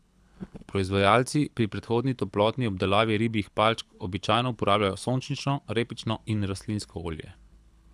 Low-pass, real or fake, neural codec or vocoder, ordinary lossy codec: none; fake; codec, 24 kHz, 6 kbps, HILCodec; none